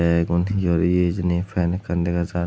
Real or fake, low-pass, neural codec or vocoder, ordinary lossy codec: real; none; none; none